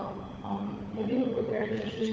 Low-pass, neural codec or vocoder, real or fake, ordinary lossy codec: none; codec, 16 kHz, 16 kbps, FunCodec, trained on LibriTTS, 50 frames a second; fake; none